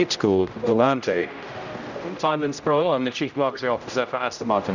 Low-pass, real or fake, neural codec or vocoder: 7.2 kHz; fake; codec, 16 kHz, 0.5 kbps, X-Codec, HuBERT features, trained on general audio